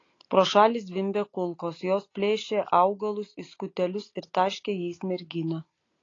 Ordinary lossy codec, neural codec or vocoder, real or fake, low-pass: AAC, 32 kbps; none; real; 7.2 kHz